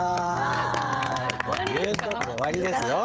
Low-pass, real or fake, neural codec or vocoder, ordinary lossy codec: none; fake; codec, 16 kHz, 16 kbps, FreqCodec, smaller model; none